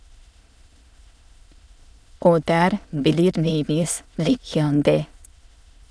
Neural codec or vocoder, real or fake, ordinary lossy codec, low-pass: autoencoder, 22.05 kHz, a latent of 192 numbers a frame, VITS, trained on many speakers; fake; none; none